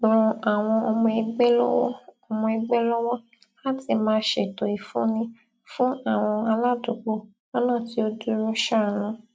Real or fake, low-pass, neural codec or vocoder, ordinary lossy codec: real; none; none; none